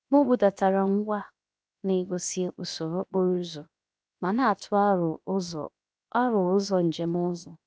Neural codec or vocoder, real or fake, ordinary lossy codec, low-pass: codec, 16 kHz, 0.7 kbps, FocalCodec; fake; none; none